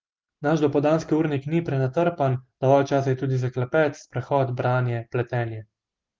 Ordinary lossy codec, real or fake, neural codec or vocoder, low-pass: Opus, 16 kbps; real; none; 7.2 kHz